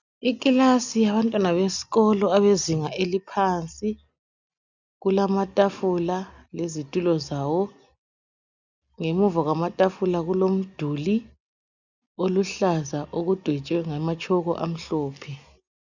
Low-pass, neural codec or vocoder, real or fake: 7.2 kHz; none; real